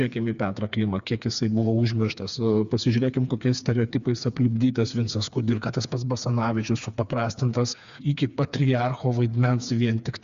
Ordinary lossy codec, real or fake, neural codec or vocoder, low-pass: Opus, 64 kbps; fake; codec, 16 kHz, 4 kbps, FreqCodec, smaller model; 7.2 kHz